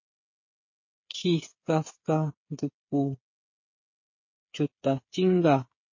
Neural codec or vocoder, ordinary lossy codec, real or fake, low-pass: codec, 16 kHz, 8 kbps, FreqCodec, smaller model; MP3, 32 kbps; fake; 7.2 kHz